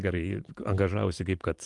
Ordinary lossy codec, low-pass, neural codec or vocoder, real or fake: Opus, 24 kbps; 10.8 kHz; vocoder, 44.1 kHz, 128 mel bands every 512 samples, BigVGAN v2; fake